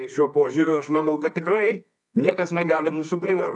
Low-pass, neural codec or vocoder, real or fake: 10.8 kHz; codec, 24 kHz, 0.9 kbps, WavTokenizer, medium music audio release; fake